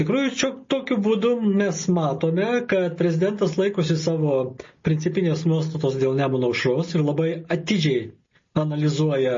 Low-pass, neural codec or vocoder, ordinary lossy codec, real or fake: 7.2 kHz; none; MP3, 32 kbps; real